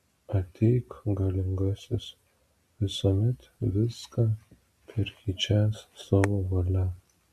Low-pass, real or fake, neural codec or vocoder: 14.4 kHz; real; none